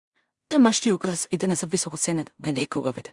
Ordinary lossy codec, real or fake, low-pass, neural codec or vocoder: Opus, 64 kbps; fake; 10.8 kHz; codec, 16 kHz in and 24 kHz out, 0.4 kbps, LongCat-Audio-Codec, two codebook decoder